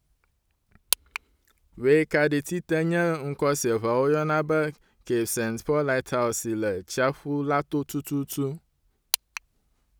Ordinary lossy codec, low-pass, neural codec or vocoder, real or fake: none; none; none; real